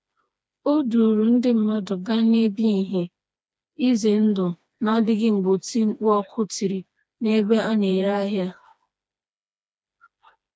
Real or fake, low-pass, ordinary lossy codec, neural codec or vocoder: fake; none; none; codec, 16 kHz, 2 kbps, FreqCodec, smaller model